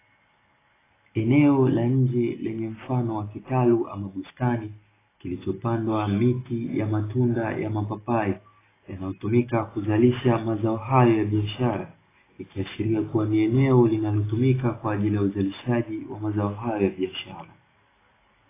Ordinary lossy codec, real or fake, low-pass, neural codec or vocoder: AAC, 16 kbps; real; 3.6 kHz; none